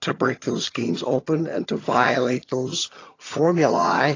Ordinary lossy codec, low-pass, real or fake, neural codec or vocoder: AAC, 32 kbps; 7.2 kHz; fake; vocoder, 22.05 kHz, 80 mel bands, HiFi-GAN